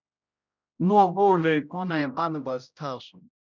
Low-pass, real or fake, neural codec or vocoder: 7.2 kHz; fake; codec, 16 kHz, 0.5 kbps, X-Codec, HuBERT features, trained on general audio